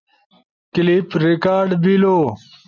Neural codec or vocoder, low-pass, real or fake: none; 7.2 kHz; real